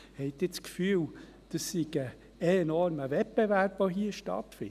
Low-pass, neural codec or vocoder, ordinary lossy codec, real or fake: 14.4 kHz; none; none; real